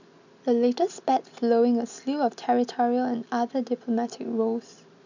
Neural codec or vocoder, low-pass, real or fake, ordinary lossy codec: none; 7.2 kHz; real; none